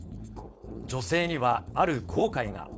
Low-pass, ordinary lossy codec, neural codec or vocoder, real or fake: none; none; codec, 16 kHz, 4.8 kbps, FACodec; fake